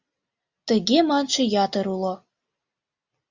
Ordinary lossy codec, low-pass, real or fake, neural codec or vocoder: Opus, 64 kbps; 7.2 kHz; real; none